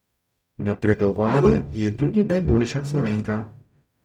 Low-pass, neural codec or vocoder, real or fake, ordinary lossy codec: 19.8 kHz; codec, 44.1 kHz, 0.9 kbps, DAC; fake; none